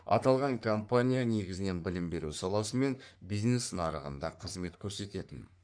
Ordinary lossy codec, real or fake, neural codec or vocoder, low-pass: none; fake; codec, 44.1 kHz, 3.4 kbps, Pupu-Codec; 9.9 kHz